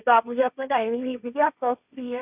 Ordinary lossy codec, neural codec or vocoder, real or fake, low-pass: none; codec, 16 kHz, 1.1 kbps, Voila-Tokenizer; fake; 3.6 kHz